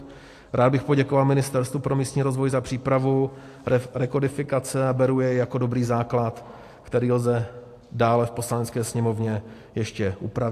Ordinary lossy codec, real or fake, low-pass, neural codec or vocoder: AAC, 64 kbps; real; 14.4 kHz; none